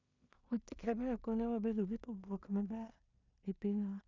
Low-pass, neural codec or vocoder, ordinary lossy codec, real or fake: 7.2 kHz; codec, 16 kHz in and 24 kHz out, 0.4 kbps, LongCat-Audio-Codec, two codebook decoder; none; fake